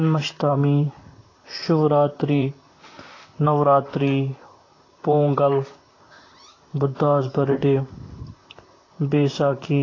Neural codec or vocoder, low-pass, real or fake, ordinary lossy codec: vocoder, 44.1 kHz, 128 mel bands, Pupu-Vocoder; 7.2 kHz; fake; AAC, 32 kbps